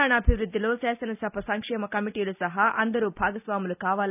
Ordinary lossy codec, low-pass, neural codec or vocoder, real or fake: none; 3.6 kHz; none; real